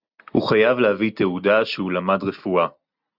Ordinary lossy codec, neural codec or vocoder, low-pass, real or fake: Opus, 64 kbps; none; 5.4 kHz; real